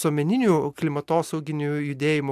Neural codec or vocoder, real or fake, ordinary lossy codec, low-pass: none; real; AAC, 96 kbps; 14.4 kHz